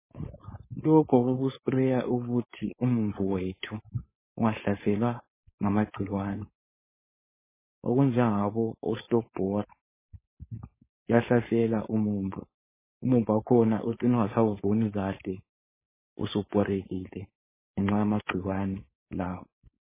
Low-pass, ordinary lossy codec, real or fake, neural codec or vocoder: 3.6 kHz; MP3, 16 kbps; fake; codec, 16 kHz, 4.8 kbps, FACodec